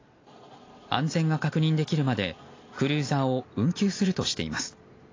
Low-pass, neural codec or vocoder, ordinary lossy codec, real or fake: 7.2 kHz; none; AAC, 32 kbps; real